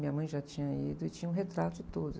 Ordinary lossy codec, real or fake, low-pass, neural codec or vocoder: none; real; none; none